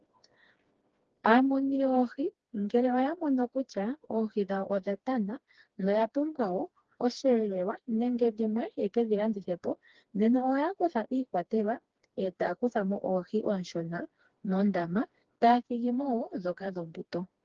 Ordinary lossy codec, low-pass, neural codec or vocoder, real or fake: Opus, 16 kbps; 7.2 kHz; codec, 16 kHz, 2 kbps, FreqCodec, smaller model; fake